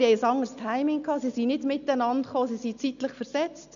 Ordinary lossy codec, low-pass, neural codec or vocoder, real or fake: none; 7.2 kHz; none; real